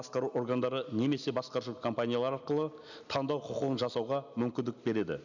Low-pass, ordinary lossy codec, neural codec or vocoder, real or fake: 7.2 kHz; none; none; real